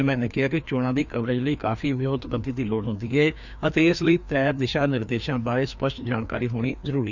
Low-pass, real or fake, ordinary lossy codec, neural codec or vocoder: 7.2 kHz; fake; none; codec, 16 kHz, 2 kbps, FreqCodec, larger model